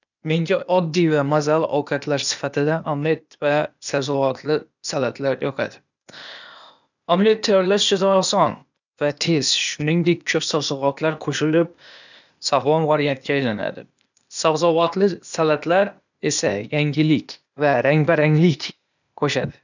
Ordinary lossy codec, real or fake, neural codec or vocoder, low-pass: none; fake; codec, 16 kHz, 0.8 kbps, ZipCodec; 7.2 kHz